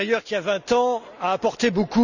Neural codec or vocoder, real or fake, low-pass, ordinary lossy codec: none; real; 7.2 kHz; none